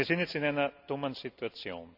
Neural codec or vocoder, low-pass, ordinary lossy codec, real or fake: none; 5.4 kHz; none; real